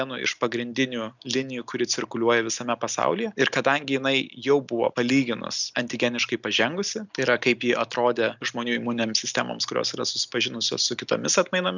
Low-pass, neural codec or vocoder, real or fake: 7.2 kHz; none; real